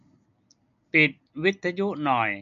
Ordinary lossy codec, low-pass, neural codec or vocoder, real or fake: none; 7.2 kHz; none; real